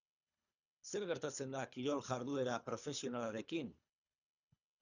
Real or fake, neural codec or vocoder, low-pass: fake; codec, 24 kHz, 3 kbps, HILCodec; 7.2 kHz